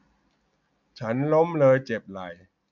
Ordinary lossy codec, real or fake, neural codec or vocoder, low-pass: none; real; none; 7.2 kHz